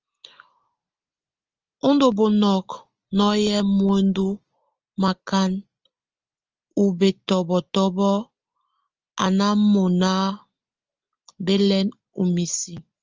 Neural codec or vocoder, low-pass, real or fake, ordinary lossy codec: none; 7.2 kHz; real; Opus, 24 kbps